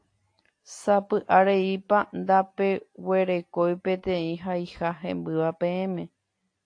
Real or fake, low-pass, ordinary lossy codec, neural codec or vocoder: real; 9.9 kHz; AAC, 48 kbps; none